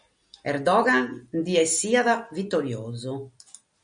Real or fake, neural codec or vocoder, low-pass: real; none; 9.9 kHz